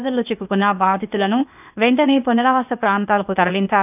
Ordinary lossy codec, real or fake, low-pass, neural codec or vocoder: none; fake; 3.6 kHz; codec, 16 kHz, 0.8 kbps, ZipCodec